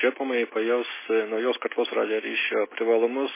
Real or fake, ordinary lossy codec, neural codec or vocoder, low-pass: real; MP3, 16 kbps; none; 3.6 kHz